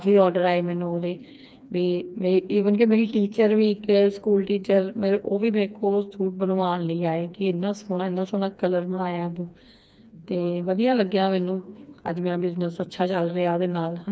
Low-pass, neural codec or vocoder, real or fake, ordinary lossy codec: none; codec, 16 kHz, 2 kbps, FreqCodec, smaller model; fake; none